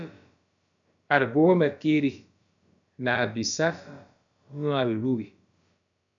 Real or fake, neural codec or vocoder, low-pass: fake; codec, 16 kHz, about 1 kbps, DyCAST, with the encoder's durations; 7.2 kHz